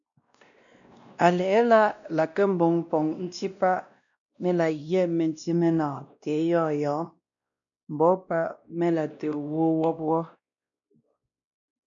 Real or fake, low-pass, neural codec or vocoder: fake; 7.2 kHz; codec, 16 kHz, 1 kbps, X-Codec, WavLM features, trained on Multilingual LibriSpeech